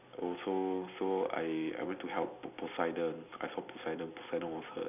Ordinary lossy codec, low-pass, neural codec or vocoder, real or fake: none; 3.6 kHz; none; real